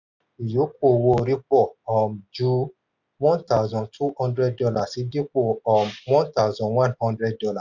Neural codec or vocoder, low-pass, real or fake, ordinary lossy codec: none; 7.2 kHz; real; none